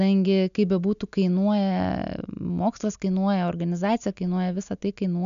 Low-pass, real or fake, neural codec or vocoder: 7.2 kHz; real; none